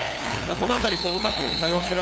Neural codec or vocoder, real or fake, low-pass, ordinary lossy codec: codec, 16 kHz, 2 kbps, FunCodec, trained on LibriTTS, 25 frames a second; fake; none; none